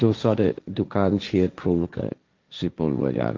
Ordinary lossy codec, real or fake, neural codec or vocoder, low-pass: Opus, 32 kbps; fake; codec, 16 kHz, 1.1 kbps, Voila-Tokenizer; 7.2 kHz